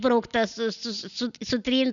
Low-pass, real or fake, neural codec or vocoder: 7.2 kHz; real; none